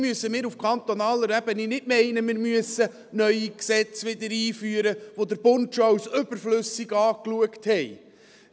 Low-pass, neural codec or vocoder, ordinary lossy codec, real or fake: none; none; none; real